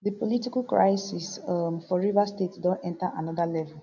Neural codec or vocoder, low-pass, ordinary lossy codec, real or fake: none; 7.2 kHz; none; real